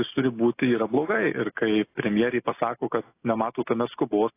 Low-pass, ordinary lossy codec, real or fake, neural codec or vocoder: 3.6 kHz; AAC, 24 kbps; real; none